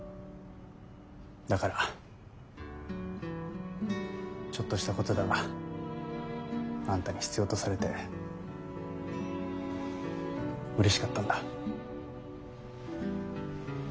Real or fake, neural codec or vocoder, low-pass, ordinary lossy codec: real; none; none; none